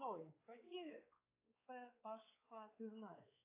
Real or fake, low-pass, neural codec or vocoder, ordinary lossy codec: fake; 3.6 kHz; codec, 16 kHz, 4 kbps, X-Codec, WavLM features, trained on Multilingual LibriSpeech; AAC, 24 kbps